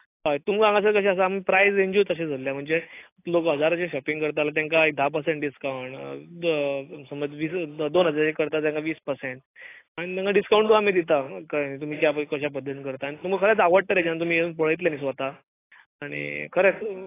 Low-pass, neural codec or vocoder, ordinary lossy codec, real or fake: 3.6 kHz; none; AAC, 24 kbps; real